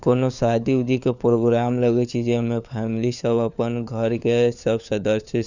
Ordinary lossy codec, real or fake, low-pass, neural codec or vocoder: none; fake; 7.2 kHz; codec, 16 kHz, 4 kbps, FunCodec, trained on LibriTTS, 50 frames a second